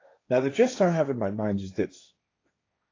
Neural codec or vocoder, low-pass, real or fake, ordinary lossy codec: codec, 16 kHz, 1.1 kbps, Voila-Tokenizer; 7.2 kHz; fake; AAC, 32 kbps